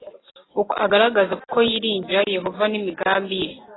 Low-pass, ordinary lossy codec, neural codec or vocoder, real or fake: 7.2 kHz; AAC, 16 kbps; none; real